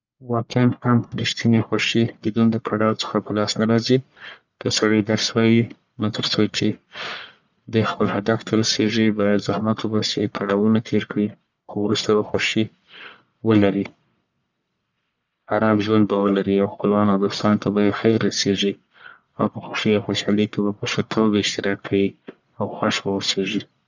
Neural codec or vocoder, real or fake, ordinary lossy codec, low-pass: codec, 44.1 kHz, 1.7 kbps, Pupu-Codec; fake; none; 7.2 kHz